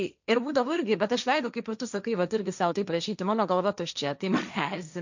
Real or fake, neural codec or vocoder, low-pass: fake; codec, 16 kHz, 1.1 kbps, Voila-Tokenizer; 7.2 kHz